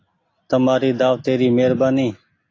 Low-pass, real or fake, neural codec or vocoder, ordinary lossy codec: 7.2 kHz; real; none; AAC, 32 kbps